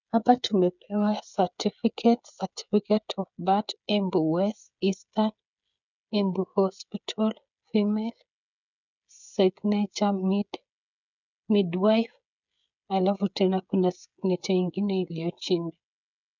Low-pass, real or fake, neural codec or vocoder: 7.2 kHz; fake; codec, 16 kHz, 16 kbps, FreqCodec, smaller model